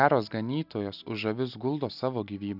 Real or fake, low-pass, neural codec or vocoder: real; 5.4 kHz; none